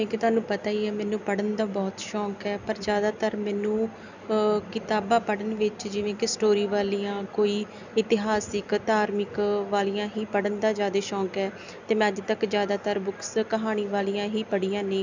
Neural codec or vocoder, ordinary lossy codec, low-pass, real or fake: none; none; 7.2 kHz; real